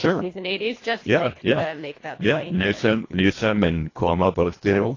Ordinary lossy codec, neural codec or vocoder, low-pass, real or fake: AAC, 32 kbps; codec, 24 kHz, 1.5 kbps, HILCodec; 7.2 kHz; fake